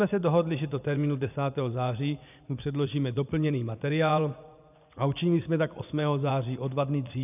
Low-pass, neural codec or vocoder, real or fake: 3.6 kHz; vocoder, 24 kHz, 100 mel bands, Vocos; fake